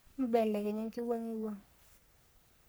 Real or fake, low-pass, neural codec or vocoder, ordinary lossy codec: fake; none; codec, 44.1 kHz, 3.4 kbps, Pupu-Codec; none